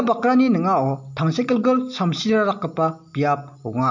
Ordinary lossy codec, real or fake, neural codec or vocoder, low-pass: MP3, 48 kbps; real; none; 7.2 kHz